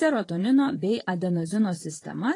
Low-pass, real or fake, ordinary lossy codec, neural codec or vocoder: 10.8 kHz; fake; AAC, 32 kbps; vocoder, 44.1 kHz, 128 mel bands, Pupu-Vocoder